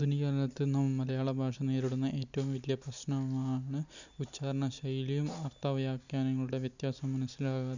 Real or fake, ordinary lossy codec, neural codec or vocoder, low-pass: real; none; none; 7.2 kHz